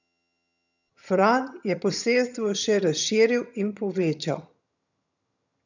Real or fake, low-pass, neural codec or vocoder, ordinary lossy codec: fake; 7.2 kHz; vocoder, 22.05 kHz, 80 mel bands, HiFi-GAN; none